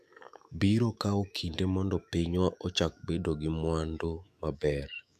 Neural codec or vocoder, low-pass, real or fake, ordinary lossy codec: autoencoder, 48 kHz, 128 numbers a frame, DAC-VAE, trained on Japanese speech; 14.4 kHz; fake; none